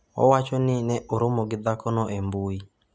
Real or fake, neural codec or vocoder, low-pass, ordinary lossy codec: real; none; none; none